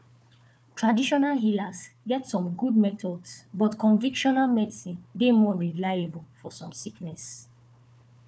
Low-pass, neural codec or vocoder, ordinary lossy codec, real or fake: none; codec, 16 kHz, 4 kbps, FunCodec, trained on Chinese and English, 50 frames a second; none; fake